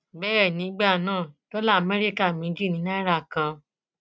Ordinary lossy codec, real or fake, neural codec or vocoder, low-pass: none; real; none; none